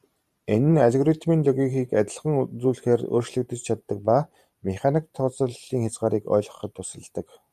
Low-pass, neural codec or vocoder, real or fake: 14.4 kHz; vocoder, 44.1 kHz, 128 mel bands every 512 samples, BigVGAN v2; fake